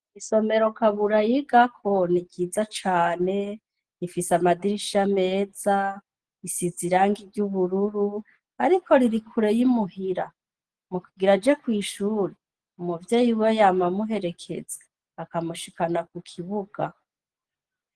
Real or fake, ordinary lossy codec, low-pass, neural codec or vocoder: real; Opus, 16 kbps; 10.8 kHz; none